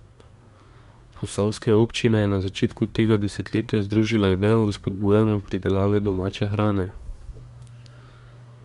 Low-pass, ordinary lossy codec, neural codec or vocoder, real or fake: 10.8 kHz; none; codec, 24 kHz, 1 kbps, SNAC; fake